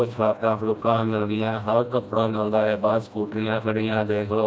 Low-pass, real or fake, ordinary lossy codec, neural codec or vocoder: none; fake; none; codec, 16 kHz, 1 kbps, FreqCodec, smaller model